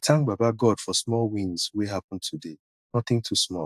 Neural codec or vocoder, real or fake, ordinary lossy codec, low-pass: vocoder, 44.1 kHz, 128 mel bands every 512 samples, BigVGAN v2; fake; AAC, 96 kbps; 14.4 kHz